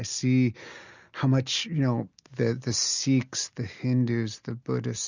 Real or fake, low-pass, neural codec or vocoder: real; 7.2 kHz; none